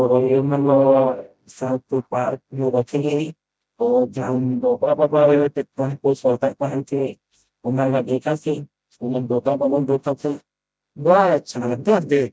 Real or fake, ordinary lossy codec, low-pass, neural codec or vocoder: fake; none; none; codec, 16 kHz, 0.5 kbps, FreqCodec, smaller model